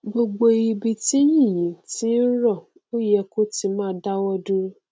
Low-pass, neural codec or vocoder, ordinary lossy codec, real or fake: none; none; none; real